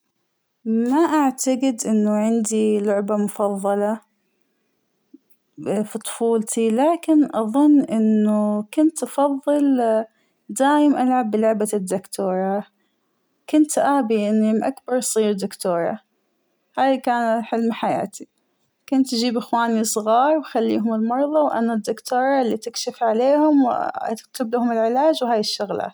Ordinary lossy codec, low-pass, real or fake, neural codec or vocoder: none; none; real; none